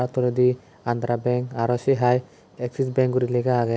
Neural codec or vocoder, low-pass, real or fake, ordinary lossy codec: none; none; real; none